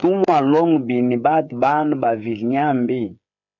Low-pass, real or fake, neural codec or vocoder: 7.2 kHz; fake; codec, 16 kHz, 8 kbps, FreqCodec, smaller model